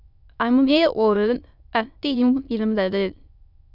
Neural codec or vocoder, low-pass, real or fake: autoencoder, 22.05 kHz, a latent of 192 numbers a frame, VITS, trained on many speakers; 5.4 kHz; fake